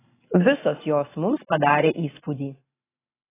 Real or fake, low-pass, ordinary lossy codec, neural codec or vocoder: real; 3.6 kHz; AAC, 16 kbps; none